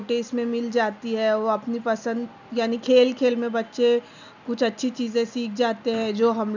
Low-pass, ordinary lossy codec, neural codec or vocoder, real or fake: 7.2 kHz; none; none; real